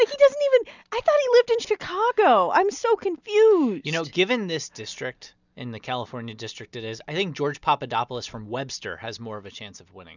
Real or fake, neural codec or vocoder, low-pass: real; none; 7.2 kHz